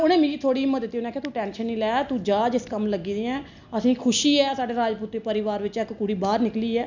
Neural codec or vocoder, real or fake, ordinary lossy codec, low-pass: none; real; none; 7.2 kHz